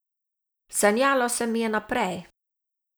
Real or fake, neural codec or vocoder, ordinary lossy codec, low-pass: real; none; none; none